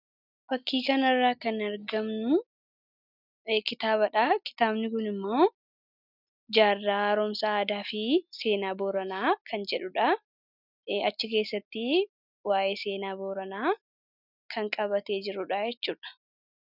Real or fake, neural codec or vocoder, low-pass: real; none; 5.4 kHz